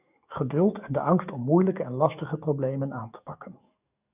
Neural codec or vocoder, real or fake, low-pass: codec, 44.1 kHz, 7.8 kbps, DAC; fake; 3.6 kHz